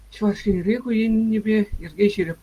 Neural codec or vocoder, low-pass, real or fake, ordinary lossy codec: none; 14.4 kHz; real; Opus, 32 kbps